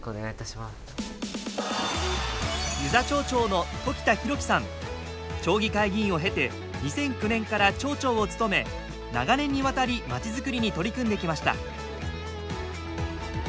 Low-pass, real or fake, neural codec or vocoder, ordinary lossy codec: none; real; none; none